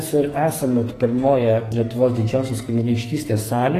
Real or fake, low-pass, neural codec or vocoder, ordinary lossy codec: fake; 14.4 kHz; codec, 44.1 kHz, 2.6 kbps, SNAC; AAC, 48 kbps